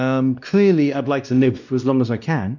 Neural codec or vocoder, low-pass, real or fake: codec, 16 kHz, 1 kbps, X-Codec, WavLM features, trained on Multilingual LibriSpeech; 7.2 kHz; fake